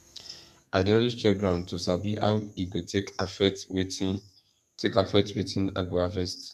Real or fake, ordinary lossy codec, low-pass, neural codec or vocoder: fake; none; 14.4 kHz; codec, 32 kHz, 1.9 kbps, SNAC